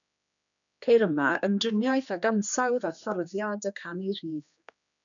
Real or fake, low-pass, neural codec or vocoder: fake; 7.2 kHz; codec, 16 kHz, 2 kbps, X-Codec, HuBERT features, trained on general audio